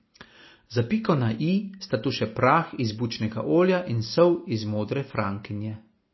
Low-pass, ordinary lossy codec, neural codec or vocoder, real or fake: 7.2 kHz; MP3, 24 kbps; none; real